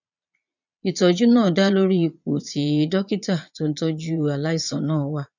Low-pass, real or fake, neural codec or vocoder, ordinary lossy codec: 7.2 kHz; fake; vocoder, 22.05 kHz, 80 mel bands, Vocos; none